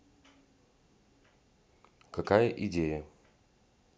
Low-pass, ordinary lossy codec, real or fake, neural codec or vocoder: none; none; real; none